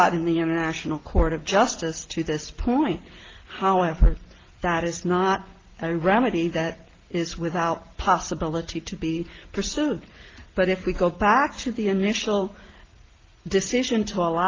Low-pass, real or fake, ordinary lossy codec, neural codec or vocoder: 7.2 kHz; real; Opus, 16 kbps; none